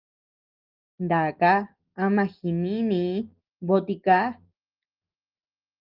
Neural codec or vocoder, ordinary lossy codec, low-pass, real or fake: none; Opus, 32 kbps; 5.4 kHz; real